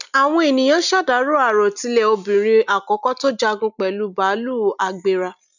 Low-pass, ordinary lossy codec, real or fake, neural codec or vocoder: 7.2 kHz; none; real; none